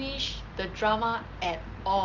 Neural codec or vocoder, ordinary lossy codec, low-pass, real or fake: none; Opus, 24 kbps; 7.2 kHz; real